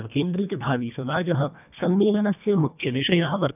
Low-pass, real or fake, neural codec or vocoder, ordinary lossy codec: 3.6 kHz; fake; codec, 24 kHz, 1.5 kbps, HILCodec; none